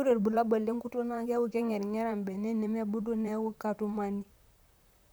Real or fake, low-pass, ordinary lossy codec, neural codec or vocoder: fake; none; none; vocoder, 44.1 kHz, 128 mel bands, Pupu-Vocoder